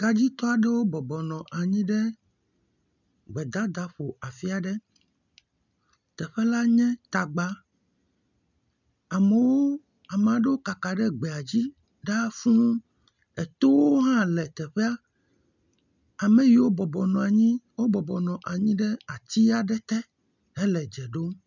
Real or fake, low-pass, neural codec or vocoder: real; 7.2 kHz; none